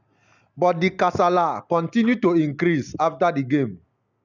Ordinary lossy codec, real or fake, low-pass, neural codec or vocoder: none; real; 7.2 kHz; none